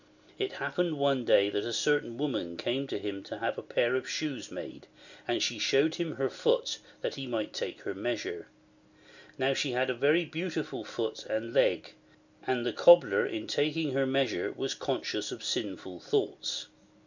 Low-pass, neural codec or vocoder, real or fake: 7.2 kHz; none; real